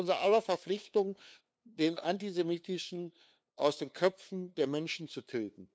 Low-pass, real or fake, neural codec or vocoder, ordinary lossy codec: none; fake; codec, 16 kHz, 2 kbps, FunCodec, trained on LibriTTS, 25 frames a second; none